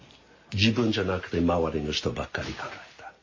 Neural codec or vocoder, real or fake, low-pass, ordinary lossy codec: none; real; 7.2 kHz; MP3, 32 kbps